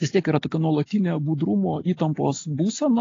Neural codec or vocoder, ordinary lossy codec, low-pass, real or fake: none; AAC, 32 kbps; 7.2 kHz; real